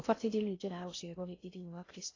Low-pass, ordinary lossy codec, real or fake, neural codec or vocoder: 7.2 kHz; AAC, 32 kbps; fake; codec, 16 kHz in and 24 kHz out, 0.6 kbps, FocalCodec, streaming, 4096 codes